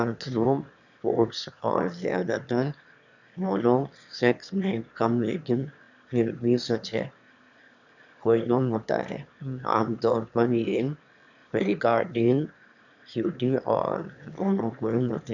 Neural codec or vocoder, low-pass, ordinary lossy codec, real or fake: autoencoder, 22.05 kHz, a latent of 192 numbers a frame, VITS, trained on one speaker; 7.2 kHz; none; fake